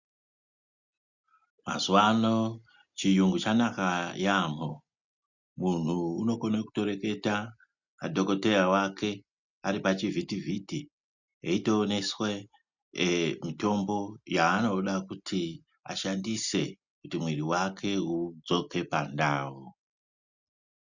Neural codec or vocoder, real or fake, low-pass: none; real; 7.2 kHz